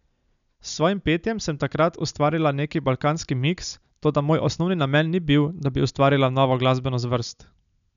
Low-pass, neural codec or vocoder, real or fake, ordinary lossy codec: 7.2 kHz; none; real; none